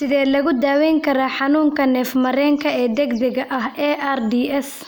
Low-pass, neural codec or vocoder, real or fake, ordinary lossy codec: none; none; real; none